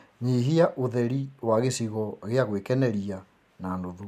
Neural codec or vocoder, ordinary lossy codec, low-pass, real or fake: none; MP3, 96 kbps; 14.4 kHz; real